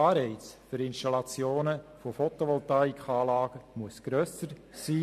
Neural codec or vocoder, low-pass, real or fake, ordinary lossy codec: none; 14.4 kHz; real; MP3, 64 kbps